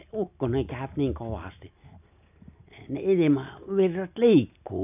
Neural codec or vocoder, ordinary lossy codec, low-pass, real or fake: none; none; 3.6 kHz; real